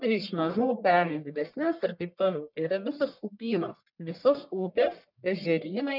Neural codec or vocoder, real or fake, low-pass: codec, 44.1 kHz, 1.7 kbps, Pupu-Codec; fake; 5.4 kHz